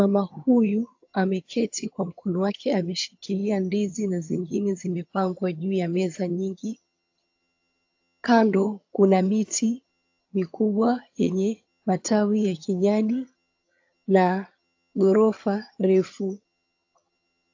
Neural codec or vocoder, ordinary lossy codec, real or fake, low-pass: vocoder, 22.05 kHz, 80 mel bands, HiFi-GAN; AAC, 48 kbps; fake; 7.2 kHz